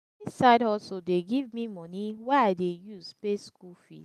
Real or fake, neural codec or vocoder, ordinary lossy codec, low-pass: real; none; none; 14.4 kHz